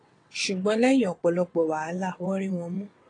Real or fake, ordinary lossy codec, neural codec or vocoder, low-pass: fake; AAC, 32 kbps; vocoder, 22.05 kHz, 80 mel bands, WaveNeXt; 9.9 kHz